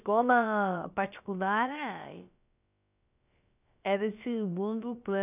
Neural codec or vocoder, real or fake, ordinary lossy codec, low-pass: codec, 16 kHz, about 1 kbps, DyCAST, with the encoder's durations; fake; none; 3.6 kHz